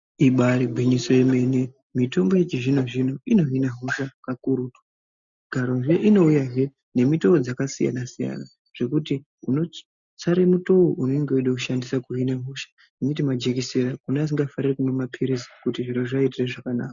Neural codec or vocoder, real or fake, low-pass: none; real; 7.2 kHz